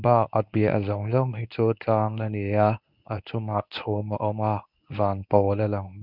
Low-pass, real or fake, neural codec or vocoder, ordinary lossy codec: 5.4 kHz; fake; codec, 24 kHz, 0.9 kbps, WavTokenizer, medium speech release version 1; none